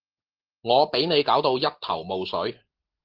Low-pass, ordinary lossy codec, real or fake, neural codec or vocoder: 5.4 kHz; Opus, 16 kbps; real; none